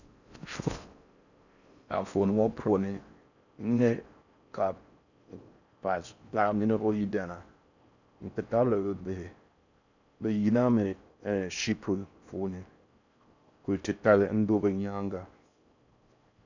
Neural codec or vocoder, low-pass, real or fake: codec, 16 kHz in and 24 kHz out, 0.6 kbps, FocalCodec, streaming, 4096 codes; 7.2 kHz; fake